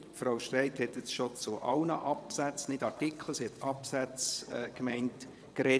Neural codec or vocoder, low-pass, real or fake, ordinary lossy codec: vocoder, 22.05 kHz, 80 mel bands, WaveNeXt; none; fake; none